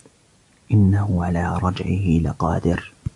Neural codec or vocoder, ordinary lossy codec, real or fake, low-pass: none; AAC, 64 kbps; real; 10.8 kHz